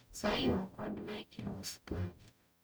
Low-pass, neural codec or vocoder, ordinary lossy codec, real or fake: none; codec, 44.1 kHz, 0.9 kbps, DAC; none; fake